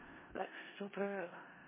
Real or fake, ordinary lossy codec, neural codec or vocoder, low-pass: fake; MP3, 16 kbps; codec, 16 kHz in and 24 kHz out, 0.4 kbps, LongCat-Audio-Codec, four codebook decoder; 3.6 kHz